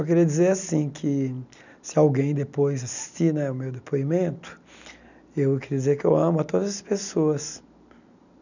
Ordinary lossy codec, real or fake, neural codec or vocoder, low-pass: none; real; none; 7.2 kHz